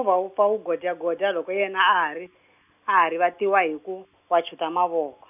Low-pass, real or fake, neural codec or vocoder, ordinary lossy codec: 3.6 kHz; real; none; none